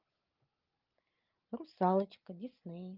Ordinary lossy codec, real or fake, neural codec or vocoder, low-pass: Opus, 32 kbps; real; none; 5.4 kHz